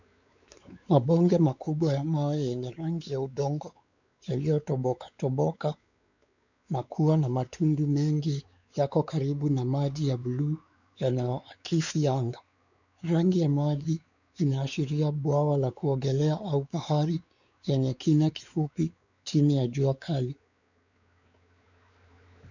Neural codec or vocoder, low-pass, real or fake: codec, 16 kHz, 4 kbps, X-Codec, WavLM features, trained on Multilingual LibriSpeech; 7.2 kHz; fake